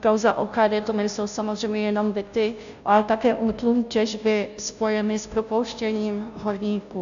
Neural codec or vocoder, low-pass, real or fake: codec, 16 kHz, 0.5 kbps, FunCodec, trained on Chinese and English, 25 frames a second; 7.2 kHz; fake